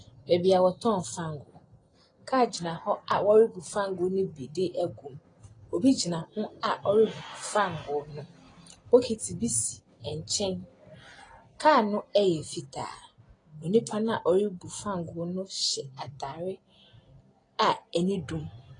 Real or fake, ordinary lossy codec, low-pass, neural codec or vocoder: real; AAC, 32 kbps; 9.9 kHz; none